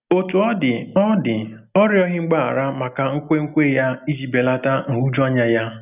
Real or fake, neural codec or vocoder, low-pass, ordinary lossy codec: real; none; 3.6 kHz; none